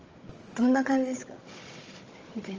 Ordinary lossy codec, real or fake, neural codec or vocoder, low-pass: Opus, 24 kbps; fake; codec, 16 kHz, 16 kbps, FunCodec, trained on Chinese and English, 50 frames a second; 7.2 kHz